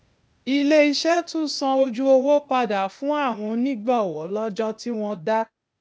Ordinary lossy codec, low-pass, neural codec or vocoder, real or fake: none; none; codec, 16 kHz, 0.8 kbps, ZipCodec; fake